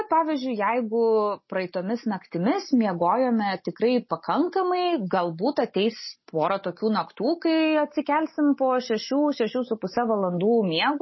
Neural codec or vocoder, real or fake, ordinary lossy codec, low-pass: none; real; MP3, 24 kbps; 7.2 kHz